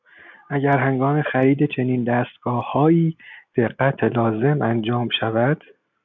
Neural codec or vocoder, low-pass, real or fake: none; 7.2 kHz; real